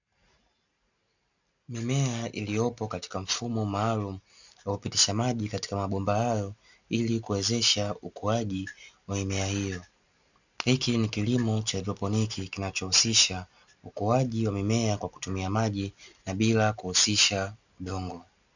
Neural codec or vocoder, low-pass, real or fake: none; 7.2 kHz; real